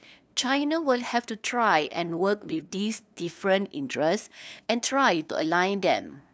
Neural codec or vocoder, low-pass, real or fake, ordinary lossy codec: codec, 16 kHz, 2 kbps, FunCodec, trained on LibriTTS, 25 frames a second; none; fake; none